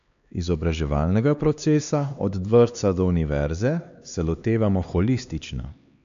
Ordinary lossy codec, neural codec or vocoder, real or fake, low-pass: AAC, 96 kbps; codec, 16 kHz, 2 kbps, X-Codec, HuBERT features, trained on LibriSpeech; fake; 7.2 kHz